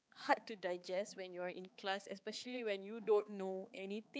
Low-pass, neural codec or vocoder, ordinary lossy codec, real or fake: none; codec, 16 kHz, 4 kbps, X-Codec, HuBERT features, trained on balanced general audio; none; fake